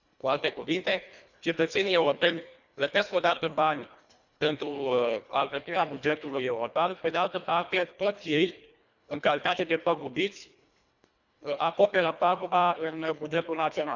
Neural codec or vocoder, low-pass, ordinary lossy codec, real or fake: codec, 24 kHz, 1.5 kbps, HILCodec; 7.2 kHz; none; fake